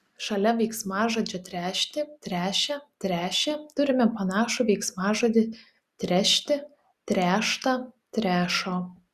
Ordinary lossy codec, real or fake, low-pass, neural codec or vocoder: Opus, 64 kbps; real; 14.4 kHz; none